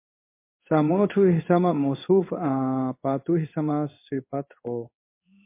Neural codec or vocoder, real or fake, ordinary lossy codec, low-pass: codec, 16 kHz in and 24 kHz out, 1 kbps, XY-Tokenizer; fake; MP3, 24 kbps; 3.6 kHz